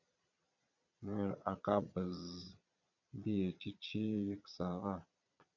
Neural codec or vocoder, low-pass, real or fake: vocoder, 22.05 kHz, 80 mel bands, Vocos; 7.2 kHz; fake